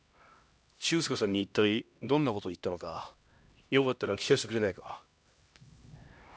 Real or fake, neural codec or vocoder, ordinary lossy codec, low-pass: fake; codec, 16 kHz, 1 kbps, X-Codec, HuBERT features, trained on LibriSpeech; none; none